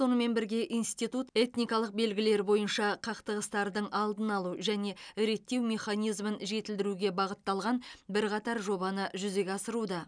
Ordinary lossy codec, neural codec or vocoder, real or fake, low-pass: none; none; real; 9.9 kHz